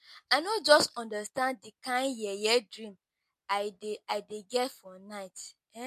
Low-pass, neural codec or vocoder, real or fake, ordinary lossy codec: 14.4 kHz; none; real; MP3, 64 kbps